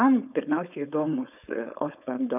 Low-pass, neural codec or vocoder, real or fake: 3.6 kHz; codec, 16 kHz, 4.8 kbps, FACodec; fake